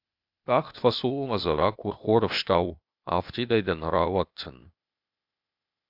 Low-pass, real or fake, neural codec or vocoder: 5.4 kHz; fake; codec, 16 kHz, 0.8 kbps, ZipCodec